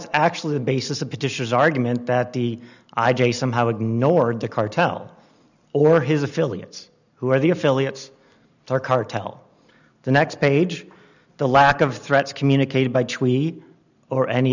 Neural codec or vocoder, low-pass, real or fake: none; 7.2 kHz; real